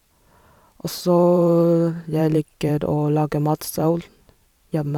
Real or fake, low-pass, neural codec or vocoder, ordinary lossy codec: fake; 19.8 kHz; vocoder, 44.1 kHz, 128 mel bands every 512 samples, BigVGAN v2; none